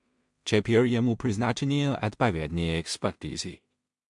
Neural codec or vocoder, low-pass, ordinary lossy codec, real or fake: codec, 16 kHz in and 24 kHz out, 0.4 kbps, LongCat-Audio-Codec, two codebook decoder; 10.8 kHz; MP3, 64 kbps; fake